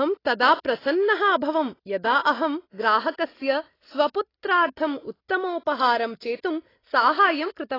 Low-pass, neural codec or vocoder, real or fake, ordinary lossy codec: 5.4 kHz; none; real; AAC, 24 kbps